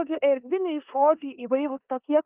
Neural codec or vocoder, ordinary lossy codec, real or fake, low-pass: codec, 16 kHz in and 24 kHz out, 0.9 kbps, LongCat-Audio-Codec, four codebook decoder; Opus, 24 kbps; fake; 3.6 kHz